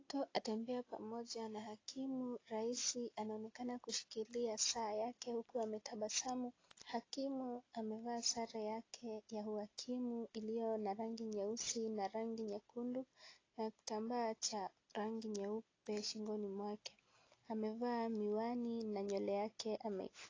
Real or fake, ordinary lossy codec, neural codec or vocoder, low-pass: real; AAC, 32 kbps; none; 7.2 kHz